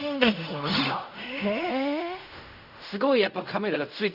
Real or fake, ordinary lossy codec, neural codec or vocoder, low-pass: fake; none; codec, 16 kHz in and 24 kHz out, 0.4 kbps, LongCat-Audio-Codec, fine tuned four codebook decoder; 5.4 kHz